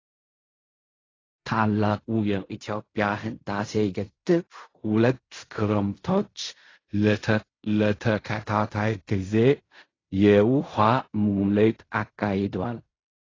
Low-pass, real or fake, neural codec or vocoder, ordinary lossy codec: 7.2 kHz; fake; codec, 16 kHz in and 24 kHz out, 0.4 kbps, LongCat-Audio-Codec, fine tuned four codebook decoder; AAC, 32 kbps